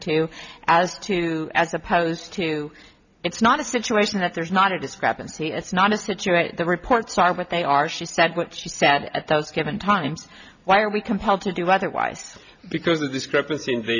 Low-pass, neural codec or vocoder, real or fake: 7.2 kHz; none; real